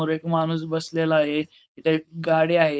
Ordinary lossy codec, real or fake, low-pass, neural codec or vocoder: none; fake; none; codec, 16 kHz, 4.8 kbps, FACodec